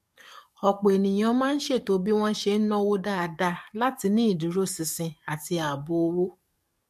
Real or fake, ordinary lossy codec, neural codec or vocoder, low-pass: fake; MP3, 64 kbps; codec, 44.1 kHz, 7.8 kbps, DAC; 14.4 kHz